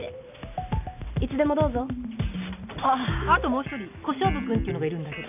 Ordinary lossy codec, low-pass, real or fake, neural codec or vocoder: none; 3.6 kHz; real; none